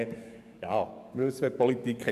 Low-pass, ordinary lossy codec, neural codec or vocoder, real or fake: 14.4 kHz; none; codec, 44.1 kHz, 7.8 kbps, DAC; fake